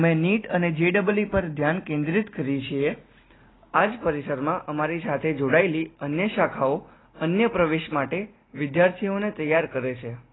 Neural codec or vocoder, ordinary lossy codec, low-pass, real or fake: none; AAC, 16 kbps; 7.2 kHz; real